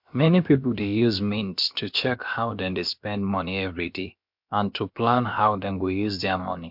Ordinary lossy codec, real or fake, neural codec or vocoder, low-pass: MP3, 48 kbps; fake; codec, 16 kHz, about 1 kbps, DyCAST, with the encoder's durations; 5.4 kHz